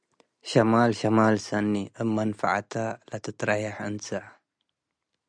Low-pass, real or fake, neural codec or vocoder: 9.9 kHz; real; none